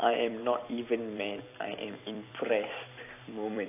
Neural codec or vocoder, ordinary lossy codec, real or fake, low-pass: vocoder, 44.1 kHz, 128 mel bands every 256 samples, BigVGAN v2; AAC, 24 kbps; fake; 3.6 kHz